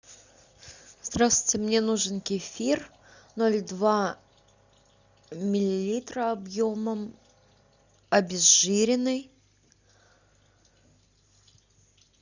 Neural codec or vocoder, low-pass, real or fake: none; 7.2 kHz; real